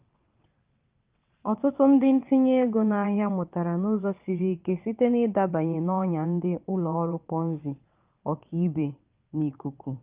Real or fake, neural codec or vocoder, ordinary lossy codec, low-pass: fake; vocoder, 22.05 kHz, 80 mel bands, WaveNeXt; Opus, 24 kbps; 3.6 kHz